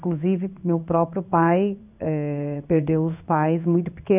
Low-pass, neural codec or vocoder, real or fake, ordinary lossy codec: 3.6 kHz; codec, 16 kHz in and 24 kHz out, 1 kbps, XY-Tokenizer; fake; none